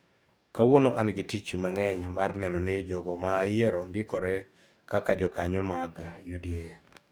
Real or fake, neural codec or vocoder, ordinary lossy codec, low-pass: fake; codec, 44.1 kHz, 2.6 kbps, DAC; none; none